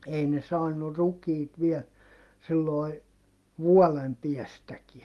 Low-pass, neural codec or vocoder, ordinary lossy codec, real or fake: 14.4 kHz; none; Opus, 32 kbps; real